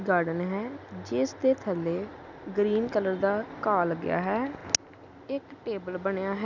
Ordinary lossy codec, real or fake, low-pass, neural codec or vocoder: none; real; 7.2 kHz; none